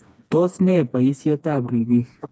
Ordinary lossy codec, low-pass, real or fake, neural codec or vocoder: none; none; fake; codec, 16 kHz, 2 kbps, FreqCodec, smaller model